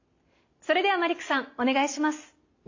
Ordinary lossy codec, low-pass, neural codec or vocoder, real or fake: AAC, 48 kbps; 7.2 kHz; none; real